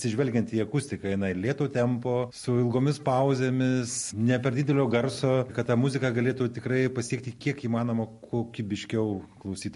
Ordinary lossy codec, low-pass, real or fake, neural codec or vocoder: MP3, 48 kbps; 14.4 kHz; real; none